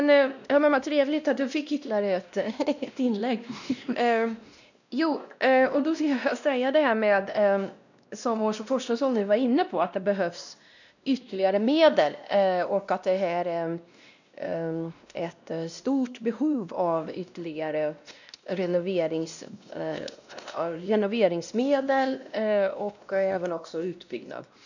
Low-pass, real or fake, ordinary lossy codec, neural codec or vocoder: 7.2 kHz; fake; none; codec, 16 kHz, 1 kbps, X-Codec, WavLM features, trained on Multilingual LibriSpeech